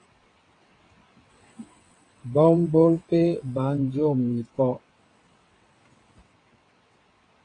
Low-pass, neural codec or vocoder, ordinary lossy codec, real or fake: 9.9 kHz; vocoder, 22.05 kHz, 80 mel bands, WaveNeXt; AAC, 32 kbps; fake